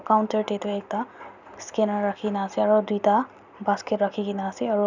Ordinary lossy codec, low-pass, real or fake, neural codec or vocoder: none; 7.2 kHz; real; none